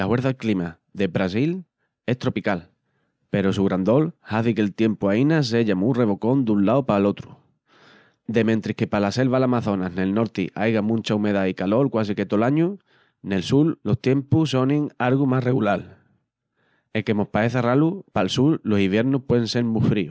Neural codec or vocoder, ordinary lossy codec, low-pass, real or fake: none; none; none; real